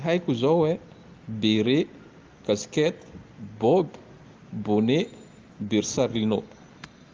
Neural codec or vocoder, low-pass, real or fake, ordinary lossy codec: none; 7.2 kHz; real; Opus, 32 kbps